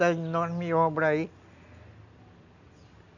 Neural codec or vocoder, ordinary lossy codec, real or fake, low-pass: none; none; real; 7.2 kHz